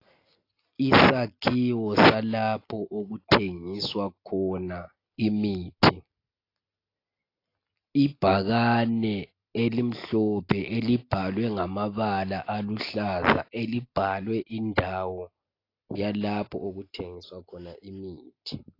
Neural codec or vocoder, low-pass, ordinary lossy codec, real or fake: none; 5.4 kHz; AAC, 32 kbps; real